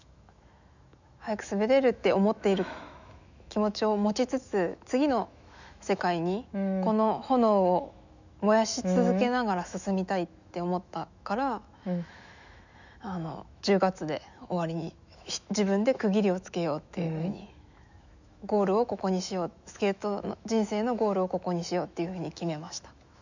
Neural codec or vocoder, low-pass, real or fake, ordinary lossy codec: autoencoder, 48 kHz, 128 numbers a frame, DAC-VAE, trained on Japanese speech; 7.2 kHz; fake; none